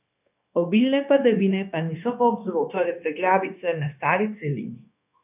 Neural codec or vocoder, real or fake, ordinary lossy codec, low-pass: codec, 16 kHz, 0.9 kbps, LongCat-Audio-Codec; fake; none; 3.6 kHz